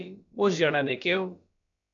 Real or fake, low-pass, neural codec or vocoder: fake; 7.2 kHz; codec, 16 kHz, about 1 kbps, DyCAST, with the encoder's durations